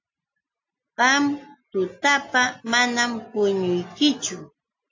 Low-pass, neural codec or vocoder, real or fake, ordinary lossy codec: 7.2 kHz; none; real; AAC, 48 kbps